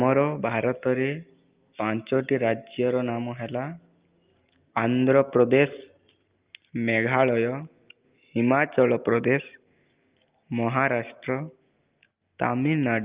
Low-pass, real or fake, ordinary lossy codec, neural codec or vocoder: 3.6 kHz; real; Opus, 32 kbps; none